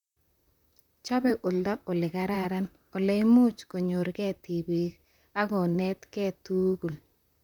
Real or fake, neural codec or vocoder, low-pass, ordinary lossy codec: fake; vocoder, 44.1 kHz, 128 mel bands, Pupu-Vocoder; 19.8 kHz; none